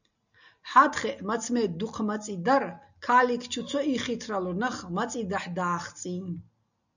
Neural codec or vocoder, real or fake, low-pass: none; real; 7.2 kHz